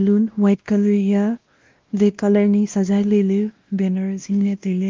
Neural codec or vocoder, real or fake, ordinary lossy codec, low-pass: codec, 16 kHz, 1 kbps, X-Codec, WavLM features, trained on Multilingual LibriSpeech; fake; Opus, 32 kbps; 7.2 kHz